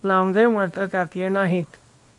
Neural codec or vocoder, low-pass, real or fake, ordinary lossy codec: codec, 24 kHz, 0.9 kbps, WavTokenizer, small release; 10.8 kHz; fake; MP3, 64 kbps